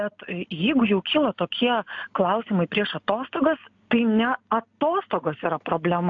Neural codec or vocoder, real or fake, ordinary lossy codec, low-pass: none; real; AAC, 64 kbps; 7.2 kHz